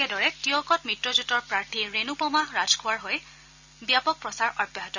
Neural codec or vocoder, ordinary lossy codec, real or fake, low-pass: none; none; real; 7.2 kHz